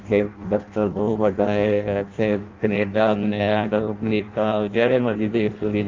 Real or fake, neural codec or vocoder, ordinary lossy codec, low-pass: fake; codec, 16 kHz in and 24 kHz out, 0.6 kbps, FireRedTTS-2 codec; Opus, 24 kbps; 7.2 kHz